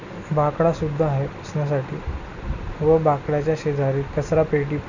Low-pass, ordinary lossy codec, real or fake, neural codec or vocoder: 7.2 kHz; none; real; none